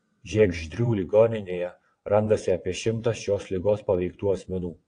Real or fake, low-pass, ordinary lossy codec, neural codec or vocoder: fake; 9.9 kHz; AAC, 48 kbps; vocoder, 22.05 kHz, 80 mel bands, WaveNeXt